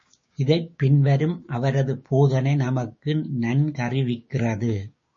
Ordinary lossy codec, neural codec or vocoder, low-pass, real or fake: MP3, 32 kbps; codec, 16 kHz, 6 kbps, DAC; 7.2 kHz; fake